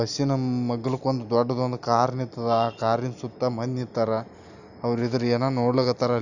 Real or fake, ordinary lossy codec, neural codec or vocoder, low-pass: real; none; none; 7.2 kHz